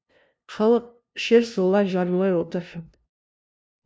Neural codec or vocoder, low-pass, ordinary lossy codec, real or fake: codec, 16 kHz, 0.5 kbps, FunCodec, trained on LibriTTS, 25 frames a second; none; none; fake